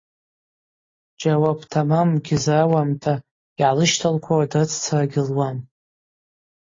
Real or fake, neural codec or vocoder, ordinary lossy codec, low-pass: real; none; AAC, 32 kbps; 7.2 kHz